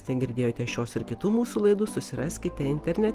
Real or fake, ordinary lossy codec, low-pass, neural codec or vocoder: real; Opus, 24 kbps; 14.4 kHz; none